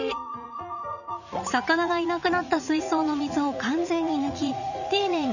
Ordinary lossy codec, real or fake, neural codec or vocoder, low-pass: none; fake; vocoder, 44.1 kHz, 80 mel bands, Vocos; 7.2 kHz